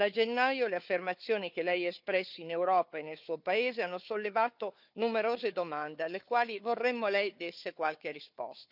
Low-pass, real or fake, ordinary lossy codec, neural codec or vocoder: 5.4 kHz; fake; none; codec, 16 kHz, 4 kbps, FunCodec, trained on Chinese and English, 50 frames a second